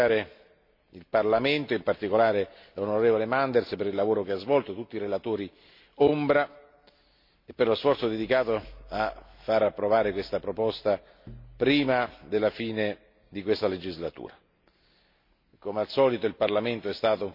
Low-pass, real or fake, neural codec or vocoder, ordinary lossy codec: 5.4 kHz; real; none; MP3, 32 kbps